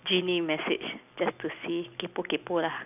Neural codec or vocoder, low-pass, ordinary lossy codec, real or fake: none; 3.6 kHz; none; real